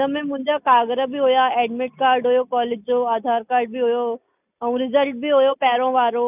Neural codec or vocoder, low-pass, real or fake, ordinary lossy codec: none; 3.6 kHz; real; none